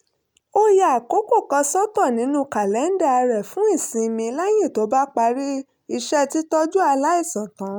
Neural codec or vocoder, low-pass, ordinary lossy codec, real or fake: none; none; none; real